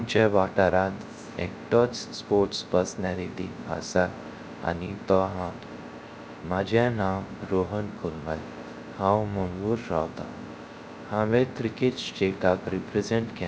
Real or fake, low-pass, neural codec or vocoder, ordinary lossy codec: fake; none; codec, 16 kHz, 0.3 kbps, FocalCodec; none